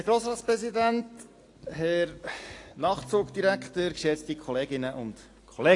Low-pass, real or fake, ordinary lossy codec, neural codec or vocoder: 10.8 kHz; real; AAC, 48 kbps; none